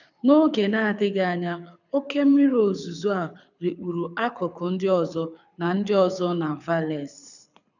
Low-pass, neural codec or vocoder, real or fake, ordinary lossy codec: 7.2 kHz; codec, 24 kHz, 6 kbps, HILCodec; fake; none